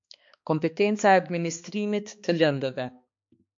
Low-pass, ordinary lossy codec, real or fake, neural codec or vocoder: 7.2 kHz; MP3, 48 kbps; fake; codec, 16 kHz, 2 kbps, X-Codec, HuBERT features, trained on balanced general audio